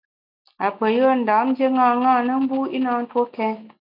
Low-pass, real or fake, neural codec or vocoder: 5.4 kHz; real; none